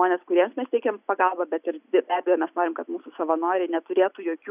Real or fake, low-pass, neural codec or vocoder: real; 3.6 kHz; none